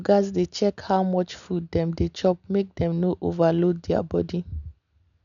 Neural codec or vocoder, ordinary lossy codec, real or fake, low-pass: none; none; real; 7.2 kHz